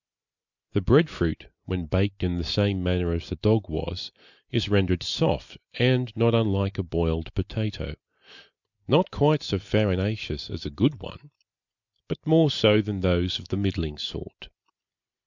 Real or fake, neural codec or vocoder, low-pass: real; none; 7.2 kHz